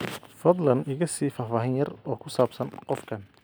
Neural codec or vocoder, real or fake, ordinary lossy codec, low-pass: none; real; none; none